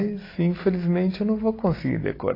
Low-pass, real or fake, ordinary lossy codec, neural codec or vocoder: 5.4 kHz; real; AAC, 24 kbps; none